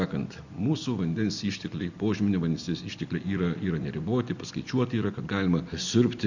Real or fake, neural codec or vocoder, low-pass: real; none; 7.2 kHz